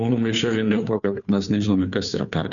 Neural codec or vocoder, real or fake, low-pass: codec, 16 kHz, 2 kbps, FunCodec, trained on Chinese and English, 25 frames a second; fake; 7.2 kHz